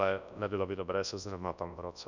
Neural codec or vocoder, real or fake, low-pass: codec, 24 kHz, 0.9 kbps, WavTokenizer, large speech release; fake; 7.2 kHz